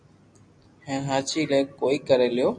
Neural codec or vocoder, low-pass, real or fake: none; 9.9 kHz; real